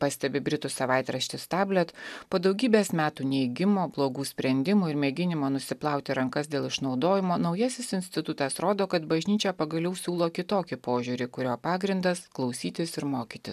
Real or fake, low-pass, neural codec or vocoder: real; 14.4 kHz; none